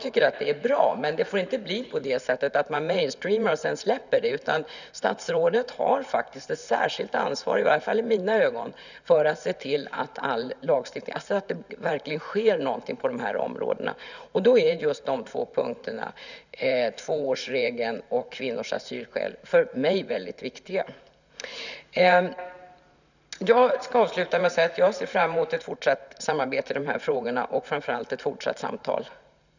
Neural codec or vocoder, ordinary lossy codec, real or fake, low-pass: vocoder, 44.1 kHz, 128 mel bands every 512 samples, BigVGAN v2; none; fake; 7.2 kHz